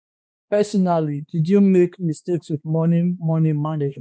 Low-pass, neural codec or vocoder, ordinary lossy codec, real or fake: none; codec, 16 kHz, 2 kbps, X-Codec, HuBERT features, trained on balanced general audio; none; fake